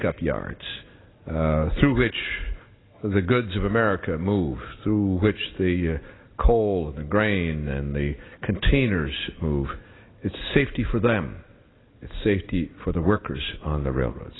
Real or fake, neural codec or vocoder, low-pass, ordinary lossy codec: real; none; 7.2 kHz; AAC, 16 kbps